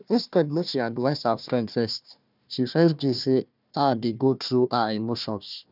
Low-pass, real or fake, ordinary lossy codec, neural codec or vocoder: 5.4 kHz; fake; none; codec, 16 kHz, 1 kbps, FunCodec, trained on Chinese and English, 50 frames a second